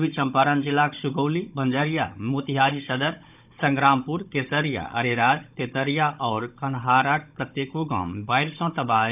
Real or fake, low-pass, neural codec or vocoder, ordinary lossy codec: fake; 3.6 kHz; codec, 16 kHz, 16 kbps, FunCodec, trained on Chinese and English, 50 frames a second; none